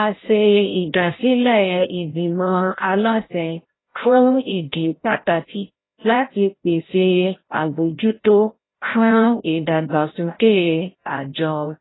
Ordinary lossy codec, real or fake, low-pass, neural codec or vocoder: AAC, 16 kbps; fake; 7.2 kHz; codec, 16 kHz, 0.5 kbps, FreqCodec, larger model